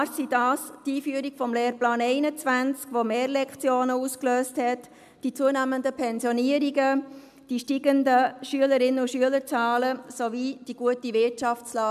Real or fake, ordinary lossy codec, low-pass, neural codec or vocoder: real; MP3, 96 kbps; 14.4 kHz; none